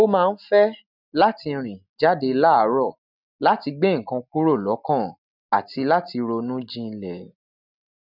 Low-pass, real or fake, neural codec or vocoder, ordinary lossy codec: 5.4 kHz; real; none; none